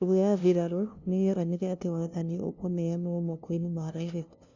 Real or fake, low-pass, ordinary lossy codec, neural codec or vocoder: fake; 7.2 kHz; none; codec, 16 kHz, 0.5 kbps, FunCodec, trained on LibriTTS, 25 frames a second